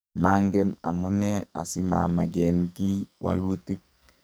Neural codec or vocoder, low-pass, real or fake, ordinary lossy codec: codec, 44.1 kHz, 2.6 kbps, SNAC; none; fake; none